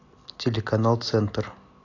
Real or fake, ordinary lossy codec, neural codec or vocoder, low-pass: real; AAC, 32 kbps; none; 7.2 kHz